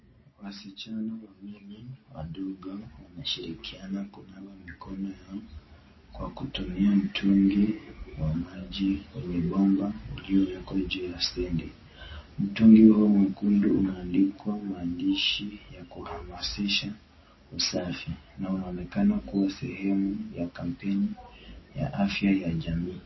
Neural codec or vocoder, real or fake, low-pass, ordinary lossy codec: none; real; 7.2 kHz; MP3, 24 kbps